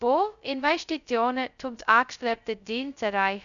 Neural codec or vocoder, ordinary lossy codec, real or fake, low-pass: codec, 16 kHz, 0.2 kbps, FocalCodec; none; fake; 7.2 kHz